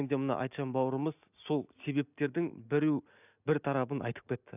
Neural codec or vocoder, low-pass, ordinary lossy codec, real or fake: none; 3.6 kHz; none; real